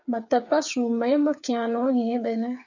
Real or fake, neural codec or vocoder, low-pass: fake; codec, 16 kHz, 8 kbps, FreqCodec, smaller model; 7.2 kHz